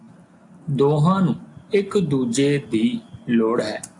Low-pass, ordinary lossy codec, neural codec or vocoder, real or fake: 10.8 kHz; AAC, 64 kbps; none; real